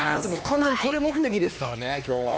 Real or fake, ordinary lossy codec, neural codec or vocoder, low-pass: fake; none; codec, 16 kHz, 2 kbps, X-Codec, HuBERT features, trained on LibriSpeech; none